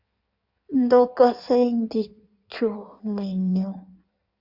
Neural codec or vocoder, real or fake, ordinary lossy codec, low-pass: codec, 16 kHz in and 24 kHz out, 1.1 kbps, FireRedTTS-2 codec; fake; Opus, 64 kbps; 5.4 kHz